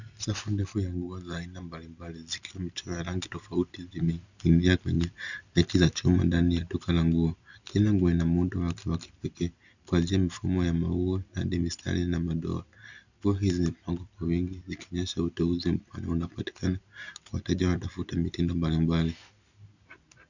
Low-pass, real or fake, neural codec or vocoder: 7.2 kHz; real; none